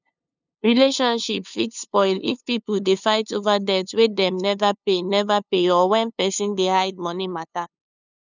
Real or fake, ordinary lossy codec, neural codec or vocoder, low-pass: fake; none; codec, 16 kHz, 2 kbps, FunCodec, trained on LibriTTS, 25 frames a second; 7.2 kHz